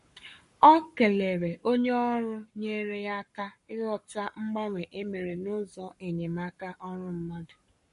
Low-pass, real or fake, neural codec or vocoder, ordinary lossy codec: 14.4 kHz; fake; codec, 44.1 kHz, 7.8 kbps, Pupu-Codec; MP3, 48 kbps